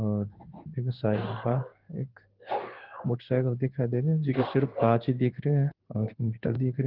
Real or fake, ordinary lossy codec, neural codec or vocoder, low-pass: fake; Opus, 16 kbps; codec, 16 kHz in and 24 kHz out, 1 kbps, XY-Tokenizer; 5.4 kHz